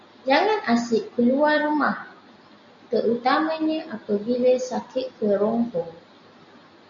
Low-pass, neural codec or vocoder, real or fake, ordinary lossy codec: 7.2 kHz; none; real; AAC, 64 kbps